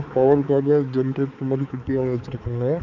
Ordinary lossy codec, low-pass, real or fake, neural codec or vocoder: none; 7.2 kHz; fake; codec, 16 kHz, 4 kbps, X-Codec, HuBERT features, trained on balanced general audio